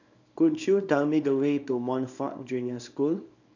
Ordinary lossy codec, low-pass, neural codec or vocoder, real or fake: none; 7.2 kHz; codec, 24 kHz, 0.9 kbps, WavTokenizer, small release; fake